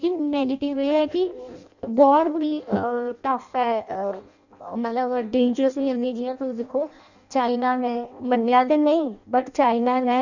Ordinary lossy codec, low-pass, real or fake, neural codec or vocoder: none; 7.2 kHz; fake; codec, 16 kHz in and 24 kHz out, 0.6 kbps, FireRedTTS-2 codec